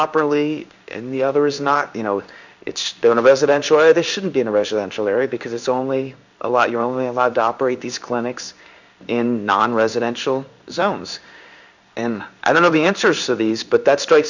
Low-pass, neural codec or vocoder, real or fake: 7.2 kHz; codec, 16 kHz in and 24 kHz out, 1 kbps, XY-Tokenizer; fake